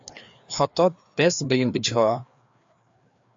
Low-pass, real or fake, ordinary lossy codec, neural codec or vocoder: 7.2 kHz; fake; AAC, 48 kbps; codec, 16 kHz, 2 kbps, FreqCodec, larger model